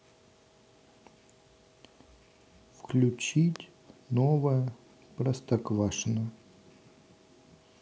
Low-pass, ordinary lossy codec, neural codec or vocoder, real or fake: none; none; none; real